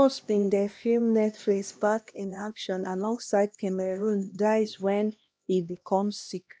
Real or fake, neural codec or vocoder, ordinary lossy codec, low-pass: fake; codec, 16 kHz, 1 kbps, X-Codec, HuBERT features, trained on LibriSpeech; none; none